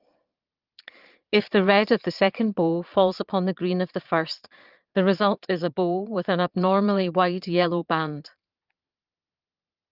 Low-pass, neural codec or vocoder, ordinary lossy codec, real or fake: 5.4 kHz; vocoder, 22.05 kHz, 80 mel bands, Vocos; Opus, 32 kbps; fake